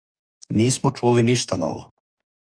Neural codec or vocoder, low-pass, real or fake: codec, 44.1 kHz, 2.6 kbps, DAC; 9.9 kHz; fake